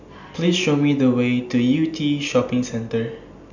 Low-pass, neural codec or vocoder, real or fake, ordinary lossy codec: 7.2 kHz; none; real; none